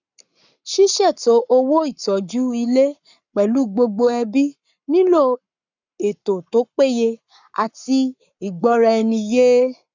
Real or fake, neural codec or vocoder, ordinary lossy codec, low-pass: fake; codec, 44.1 kHz, 7.8 kbps, Pupu-Codec; none; 7.2 kHz